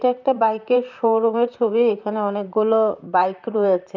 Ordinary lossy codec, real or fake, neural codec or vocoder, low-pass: none; fake; vocoder, 44.1 kHz, 128 mel bands, Pupu-Vocoder; 7.2 kHz